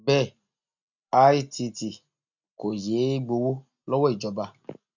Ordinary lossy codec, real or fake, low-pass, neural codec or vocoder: none; real; 7.2 kHz; none